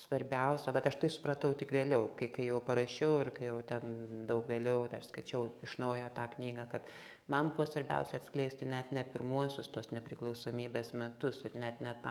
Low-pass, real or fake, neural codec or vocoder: 19.8 kHz; fake; codec, 44.1 kHz, 7.8 kbps, DAC